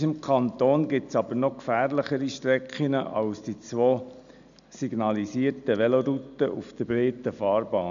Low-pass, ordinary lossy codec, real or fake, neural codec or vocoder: 7.2 kHz; none; real; none